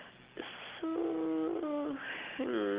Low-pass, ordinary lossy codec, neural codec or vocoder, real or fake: 3.6 kHz; Opus, 16 kbps; none; real